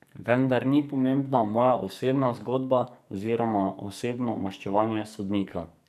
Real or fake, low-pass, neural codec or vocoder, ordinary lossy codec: fake; 14.4 kHz; codec, 44.1 kHz, 2.6 kbps, SNAC; none